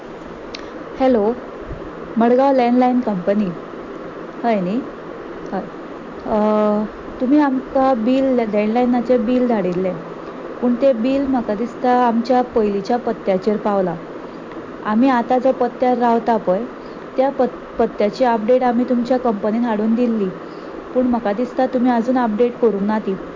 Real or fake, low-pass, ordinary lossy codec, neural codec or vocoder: real; 7.2 kHz; MP3, 48 kbps; none